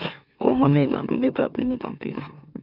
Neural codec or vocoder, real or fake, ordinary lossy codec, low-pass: autoencoder, 44.1 kHz, a latent of 192 numbers a frame, MeloTTS; fake; none; 5.4 kHz